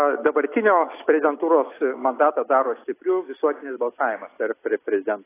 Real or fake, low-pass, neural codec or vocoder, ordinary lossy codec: real; 3.6 kHz; none; AAC, 16 kbps